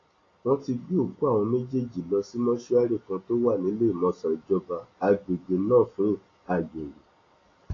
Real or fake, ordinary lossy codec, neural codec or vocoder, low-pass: real; AAC, 32 kbps; none; 7.2 kHz